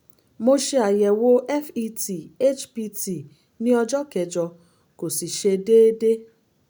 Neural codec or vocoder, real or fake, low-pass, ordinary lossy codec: none; real; none; none